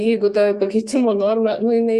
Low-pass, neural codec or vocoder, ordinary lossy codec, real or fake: 14.4 kHz; codec, 32 kHz, 1.9 kbps, SNAC; Opus, 64 kbps; fake